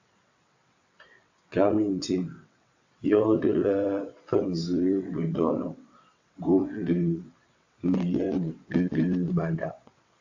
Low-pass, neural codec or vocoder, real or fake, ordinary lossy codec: 7.2 kHz; vocoder, 22.05 kHz, 80 mel bands, WaveNeXt; fake; AAC, 48 kbps